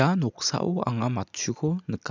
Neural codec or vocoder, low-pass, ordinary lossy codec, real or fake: none; 7.2 kHz; none; real